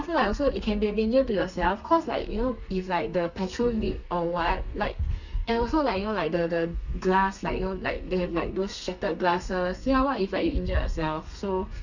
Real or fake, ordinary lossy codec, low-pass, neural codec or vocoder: fake; none; 7.2 kHz; codec, 32 kHz, 1.9 kbps, SNAC